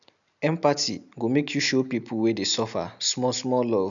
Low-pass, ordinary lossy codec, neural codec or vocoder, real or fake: 7.2 kHz; none; none; real